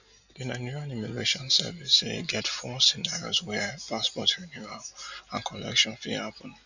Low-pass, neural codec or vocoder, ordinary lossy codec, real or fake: 7.2 kHz; none; none; real